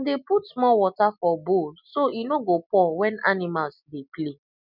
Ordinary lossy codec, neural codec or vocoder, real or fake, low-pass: none; none; real; 5.4 kHz